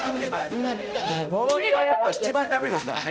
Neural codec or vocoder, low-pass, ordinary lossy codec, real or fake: codec, 16 kHz, 0.5 kbps, X-Codec, HuBERT features, trained on balanced general audio; none; none; fake